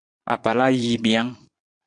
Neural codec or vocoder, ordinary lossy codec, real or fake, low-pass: vocoder, 22.05 kHz, 80 mel bands, Vocos; AAC, 64 kbps; fake; 9.9 kHz